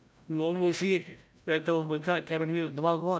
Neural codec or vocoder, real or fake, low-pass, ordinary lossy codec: codec, 16 kHz, 0.5 kbps, FreqCodec, larger model; fake; none; none